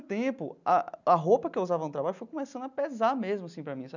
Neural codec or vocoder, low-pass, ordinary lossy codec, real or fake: none; 7.2 kHz; none; real